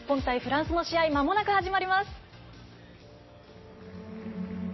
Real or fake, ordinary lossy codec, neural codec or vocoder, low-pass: real; MP3, 24 kbps; none; 7.2 kHz